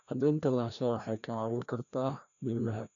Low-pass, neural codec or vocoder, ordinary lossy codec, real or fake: 7.2 kHz; codec, 16 kHz, 1 kbps, FreqCodec, larger model; none; fake